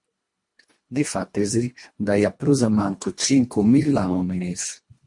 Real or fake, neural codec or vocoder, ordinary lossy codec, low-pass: fake; codec, 24 kHz, 1.5 kbps, HILCodec; MP3, 48 kbps; 10.8 kHz